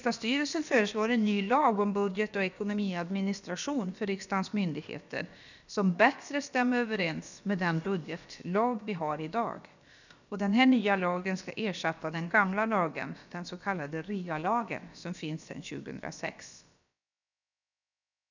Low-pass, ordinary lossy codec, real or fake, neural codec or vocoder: 7.2 kHz; none; fake; codec, 16 kHz, about 1 kbps, DyCAST, with the encoder's durations